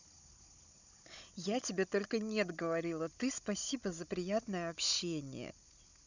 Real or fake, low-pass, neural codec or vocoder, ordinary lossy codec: fake; 7.2 kHz; codec, 16 kHz, 16 kbps, FunCodec, trained on Chinese and English, 50 frames a second; none